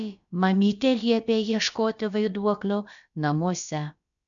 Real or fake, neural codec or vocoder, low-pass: fake; codec, 16 kHz, about 1 kbps, DyCAST, with the encoder's durations; 7.2 kHz